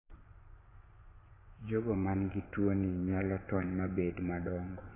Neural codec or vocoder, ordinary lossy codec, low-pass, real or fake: vocoder, 24 kHz, 100 mel bands, Vocos; AAC, 16 kbps; 3.6 kHz; fake